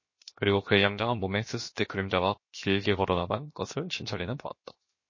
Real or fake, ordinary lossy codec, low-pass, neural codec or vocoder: fake; MP3, 32 kbps; 7.2 kHz; codec, 16 kHz, 0.7 kbps, FocalCodec